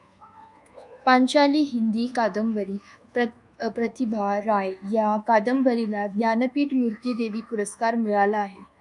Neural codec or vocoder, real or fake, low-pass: codec, 24 kHz, 1.2 kbps, DualCodec; fake; 10.8 kHz